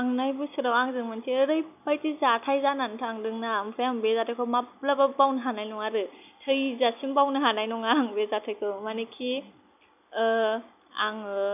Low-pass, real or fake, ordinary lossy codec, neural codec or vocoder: 3.6 kHz; real; none; none